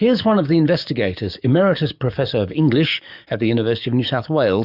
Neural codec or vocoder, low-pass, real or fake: codec, 44.1 kHz, 7.8 kbps, DAC; 5.4 kHz; fake